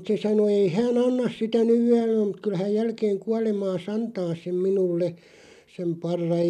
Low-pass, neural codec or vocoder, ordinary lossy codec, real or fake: 14.4 kHz; none; none; real